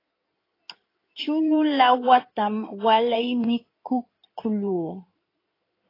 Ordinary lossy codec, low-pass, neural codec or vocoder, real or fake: AAC, 24 kbps; 5.4 kHz; codec, 16 kHz in and 24 kHz out, 2.2 kbps, FireRedTTS-2 codec; fake